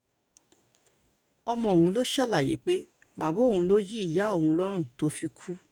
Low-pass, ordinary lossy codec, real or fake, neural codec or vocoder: 19.8 kHz; none; fake; codec, 44.1 kHz, 2.6 kbps, DAC